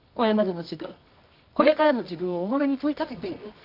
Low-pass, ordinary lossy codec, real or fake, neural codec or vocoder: 5.4 kHz; none; fake; codec, 24 kHz, 0.9 kbps, WavTokenizer, medium music audio release